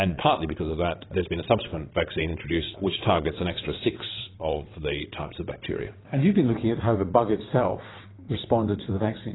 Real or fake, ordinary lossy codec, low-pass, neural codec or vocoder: fake; AAC, 16 kbps; 7.2 kHz; vocoder, 22.05 kHz, 80 mel bands, WaveNeXt